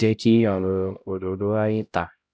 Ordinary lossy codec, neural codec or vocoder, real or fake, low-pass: none; codec, 16 kHz, 0.5 kbps, X-Codec, HuBERT features, trained on LibriSpeech; fake; none